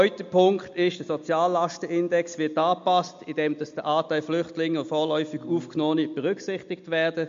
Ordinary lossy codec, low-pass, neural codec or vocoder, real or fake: MP3, 48 kbps; 7.2 kHz; none; real